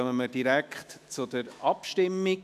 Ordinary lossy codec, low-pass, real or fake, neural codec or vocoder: none; 14.4 kHz; fake; autoencoder, 48 kHz, 128 numbers a frame, DAC-VAE, trained on Japanese speech